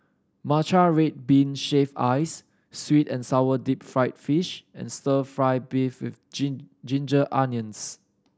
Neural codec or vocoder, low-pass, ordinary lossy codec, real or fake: none; none; none; real